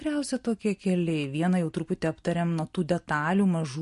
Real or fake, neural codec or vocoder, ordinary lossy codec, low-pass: real; none; MP3, 48 kbps; 10.8 kHz